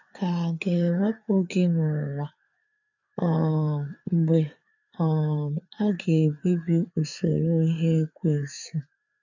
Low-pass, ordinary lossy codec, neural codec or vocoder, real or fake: 7.2 kHz; none; codec, 16 kHz, 4 kbps, FreqCodec, larger model; fake